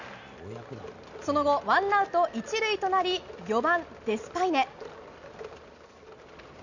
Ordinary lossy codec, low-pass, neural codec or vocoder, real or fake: none; 7.2 kHz; none; real